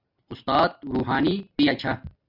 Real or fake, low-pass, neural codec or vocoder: real; 5.4 kHz; none